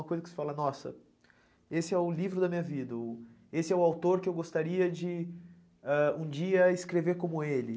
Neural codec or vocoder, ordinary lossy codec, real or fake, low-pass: none; none; real; none